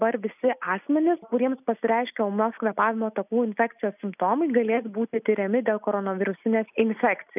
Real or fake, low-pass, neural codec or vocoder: real; 3.6 kHz; none